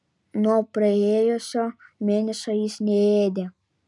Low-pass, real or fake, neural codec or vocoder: 10.8 kHz; real; none